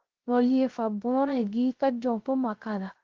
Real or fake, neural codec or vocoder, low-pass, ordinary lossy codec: fake; codec, 16 kHz, 0.3 kbps, FocalCodec; 7.2 kHz; Opus, 16 kbps